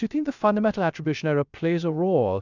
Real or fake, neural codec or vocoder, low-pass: fake; codec, 16 kHz, 0.3 kbps, FocalCodec; 7.2 kHz